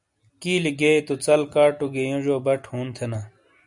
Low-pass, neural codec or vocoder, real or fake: 10.8 kHz; none; real